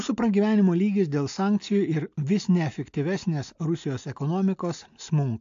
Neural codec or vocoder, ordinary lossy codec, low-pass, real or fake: none; AAC, 64 kbps; 7.2 kHz; real